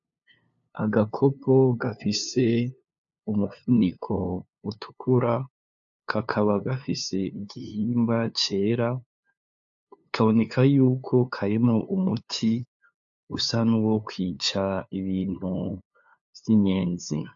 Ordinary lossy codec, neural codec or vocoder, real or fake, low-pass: AAC, 64 kbps; codec, 16 kHz, 2 kbps, FunCodec, trained on LibriTTS, 25 frames a second; fake; 7.2 kHz